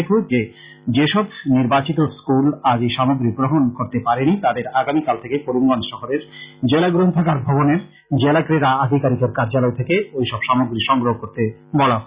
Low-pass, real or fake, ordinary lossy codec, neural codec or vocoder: 3.6 kHz; real; Opus, 64 kbps; none